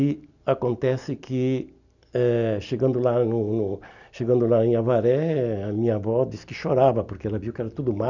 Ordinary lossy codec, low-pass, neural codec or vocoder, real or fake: none; 7.2 kHz; none; real